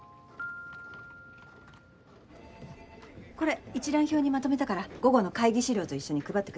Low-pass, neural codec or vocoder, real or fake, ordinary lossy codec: none; none; real; none